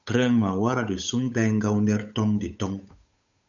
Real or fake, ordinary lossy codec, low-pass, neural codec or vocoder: fake; MP3, 96 kbps; 7.2 kHz; codec, 16 kHz, 8 kbps, FunCodec, trained on Chinese and English, 25 frames a second